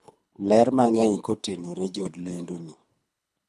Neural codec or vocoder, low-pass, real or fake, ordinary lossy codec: codec, 24 kHz, 3 kbps, HILCodec; none; fake; none